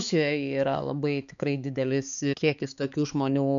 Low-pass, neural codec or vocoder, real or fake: 7.2 kHz; codec, 16 kHz, 4 kbps, X-Codec, HuBERT features, trained on balanced general audio; fake